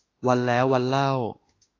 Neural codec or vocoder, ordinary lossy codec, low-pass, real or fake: autoencoder, 48 kHz, 32 numbers a frame, DAC-VAE, trained on Japanese speech; AAC, 32 kbps; 7.2 kHz; fake